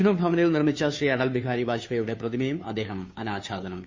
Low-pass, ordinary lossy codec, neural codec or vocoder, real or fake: 7.2 kHz; MP3, 32 kbps; codec, 16 kHz, 2 kbps, FunCodec, trained on Chinese and English, 25 frames a second; fake